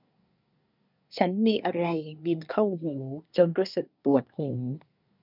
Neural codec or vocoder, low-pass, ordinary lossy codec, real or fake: codec, 24 kHz, 1 kbps, SNAC; 5.4 kHz; none; fake